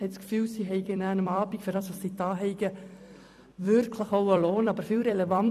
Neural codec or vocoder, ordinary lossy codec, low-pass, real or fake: vocoder, 44.1 kHz, 128 mel bands every 256 samples, BigVGAN v2; none; 14.4 kHz; fake